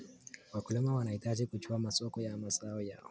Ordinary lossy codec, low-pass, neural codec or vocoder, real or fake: none; none; none; real